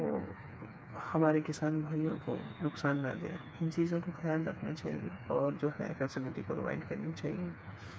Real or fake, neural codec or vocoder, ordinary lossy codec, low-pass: fake; codec, 16 kHz, 4 kbps, FreqCodec, smaller model; none; none